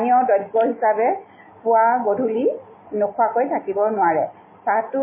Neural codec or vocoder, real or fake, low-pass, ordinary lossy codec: none; real; 3.6 kHz; MP3, 16 kbps